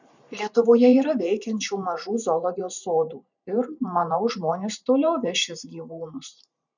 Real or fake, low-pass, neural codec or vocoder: fake; 7.2 kHz; vocoder, 44.1 kHz, 128 mel bands every 512 samples, BigVGAN v2